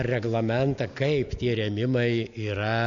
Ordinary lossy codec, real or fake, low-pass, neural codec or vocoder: AAC, 48 kbps; real; 7.2 kHz; none